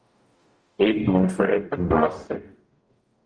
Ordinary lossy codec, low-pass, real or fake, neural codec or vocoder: Opus, 32 kbps; 9.9 kHz; fake; codec, 44.1 kHz, 0.9 kbps, DAC